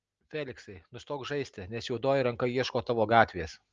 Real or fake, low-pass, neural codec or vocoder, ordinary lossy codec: real; 7.2 kHz; none; Opus, 24 kbps